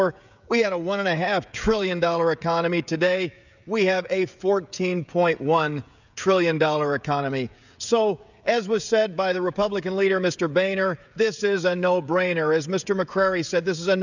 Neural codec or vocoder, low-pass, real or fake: codec, 16 kHz, 16 kbps, FreqCodec, smaller model; 7.2 kHz; fake